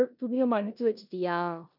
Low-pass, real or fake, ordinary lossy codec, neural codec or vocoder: 5.4 kHz; fake; none; codec, 16 kHz in and 24 kHz out, 0.9 kbps, LongCat-Audio-Codec, four codebook decoder